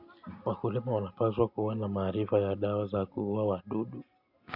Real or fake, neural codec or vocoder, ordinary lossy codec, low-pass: real; none; MP3, 48 kbps; 5.4 kHz